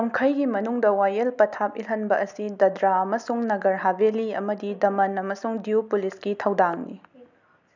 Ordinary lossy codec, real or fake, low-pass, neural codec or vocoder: none; real; 7.2 kHz; none